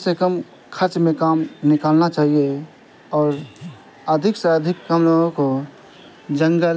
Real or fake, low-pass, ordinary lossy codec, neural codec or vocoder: real; none; none; none